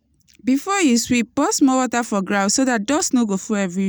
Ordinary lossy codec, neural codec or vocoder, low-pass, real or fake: none; none; none; real